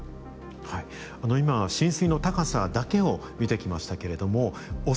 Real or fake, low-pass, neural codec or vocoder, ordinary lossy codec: real; none; none; none